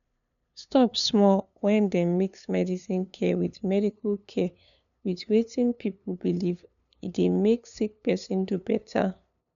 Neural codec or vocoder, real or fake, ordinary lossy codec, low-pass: codec, 16 kHz, 2 kbps, FunCodec, trained on LibriTTS, 25 frames a second; fake; none; 7.2 kHz